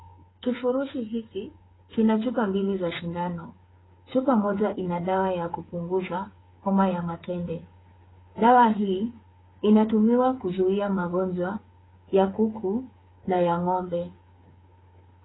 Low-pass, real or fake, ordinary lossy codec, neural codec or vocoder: 7.2 kHz; fake; AAC, 16 kbps; codec, 16 kHz, 8 kbps, FreqCodec, smaller model